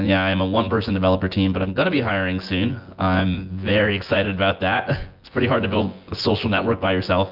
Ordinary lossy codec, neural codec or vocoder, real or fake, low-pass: Opus, 32 kbps; vocoder, 24 kHz, 100 mel bands, Vocos; fake; 5.4 kHz